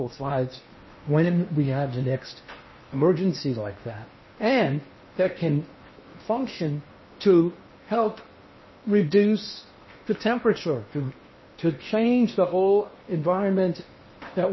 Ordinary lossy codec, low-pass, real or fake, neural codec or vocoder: MP3, 24 kbps; 7.2 kHz; fake; codec, 16 kHz in and 24 kHz out, 0.8 kbps, FocalCodec, streaming, 65536 codes